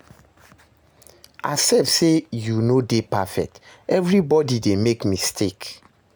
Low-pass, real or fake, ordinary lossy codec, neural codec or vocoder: none; real; none; none